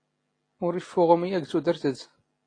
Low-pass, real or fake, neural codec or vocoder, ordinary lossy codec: 9.9 kHz; real; none; AAC, 32 kbps